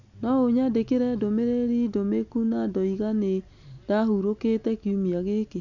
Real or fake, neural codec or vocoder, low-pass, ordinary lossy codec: real; none; 7.2 kHz; none